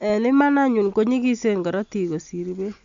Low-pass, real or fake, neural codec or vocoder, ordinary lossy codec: 7.2 kHz; real; none; AAC, 64 kbps